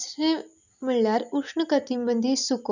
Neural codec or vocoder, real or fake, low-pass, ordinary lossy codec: none; real; 7.2 kHz; none